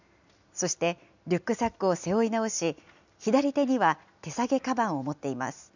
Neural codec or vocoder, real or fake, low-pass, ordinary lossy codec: none; real; 7.2 kHz; none